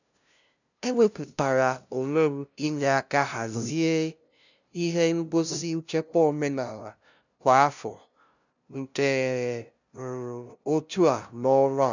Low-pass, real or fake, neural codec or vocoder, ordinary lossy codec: 7.2 kHz; fake; codec, 16 kHz, 0.5 kbps, FunCodec, trained on LibriTTS, 25 frames a second; none